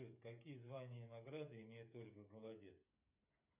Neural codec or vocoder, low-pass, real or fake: codec, 16 kHz, 8 kbps, FreqCodec, smaller model; 3.6 kHz; fake